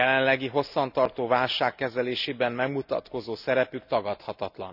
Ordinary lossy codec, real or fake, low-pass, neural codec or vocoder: none; real; 5.4 kHz; none